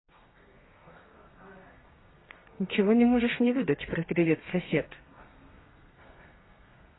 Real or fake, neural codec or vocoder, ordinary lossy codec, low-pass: fake; codec, 32 kHz, 1.9 kbps, SNAC; AAC, 16 kbps; 7.2 kHz